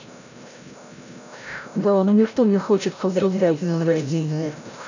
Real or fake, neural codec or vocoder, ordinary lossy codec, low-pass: fake; codec, 16 kHz, 0.5 kbps, FreqCodec, larger model; none; 7.2 kHz